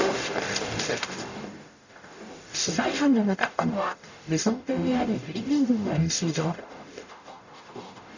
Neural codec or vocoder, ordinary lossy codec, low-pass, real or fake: codec, 44.1 kHz, 0.9 kbps, DAC; none; 7.2 kHz; fake